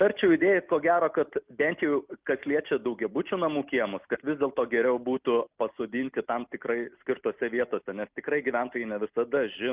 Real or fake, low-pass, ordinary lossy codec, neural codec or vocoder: real; 3.6 kHz; Opus, 24 kbps; none